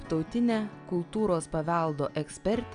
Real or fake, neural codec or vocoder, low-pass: real; none; 9.9 kHz